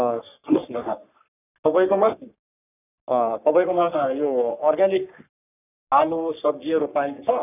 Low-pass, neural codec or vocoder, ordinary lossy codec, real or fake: 3.6 kHz; codec, 44.1 kHz, 3.4 kbps, Pupu-Codec; none; fake